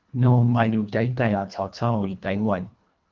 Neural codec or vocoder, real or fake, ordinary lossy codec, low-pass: codec, 24 kHz, 1.5 kbps, HILCodec; fake; Opus, 32 kbps; 7.2 kHz